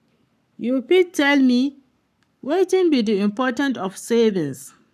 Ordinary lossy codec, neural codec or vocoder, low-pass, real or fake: none; codec, 44.1 kHz, 7.8 kbps, Pupu-Codec; 14.4 kHz; fake